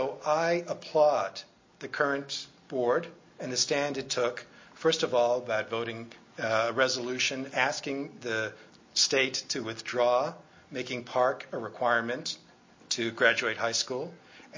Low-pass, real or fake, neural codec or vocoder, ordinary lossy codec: 7.2 kHz; real; none; MP3, 32 kbps